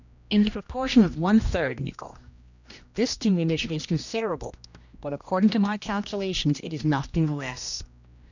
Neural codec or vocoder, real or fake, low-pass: codec, 16 kHz, 1 kbps, X-Codec, HuBERT features, trained on general audio; fake; 7.2 kHz